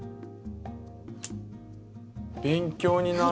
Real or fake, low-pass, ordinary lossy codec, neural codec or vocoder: real; none; none; none